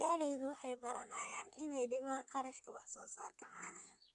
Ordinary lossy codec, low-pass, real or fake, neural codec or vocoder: none; 10.8 kHz; fake; codec, 24 kHz, 1 kbps, SNAC